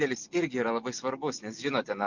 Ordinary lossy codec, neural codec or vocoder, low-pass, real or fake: MP3, 64 kbps; none; 7.2 kHz; real